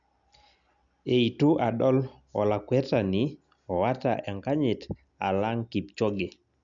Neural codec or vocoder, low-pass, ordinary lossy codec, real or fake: none; 7.2 kHz; none; real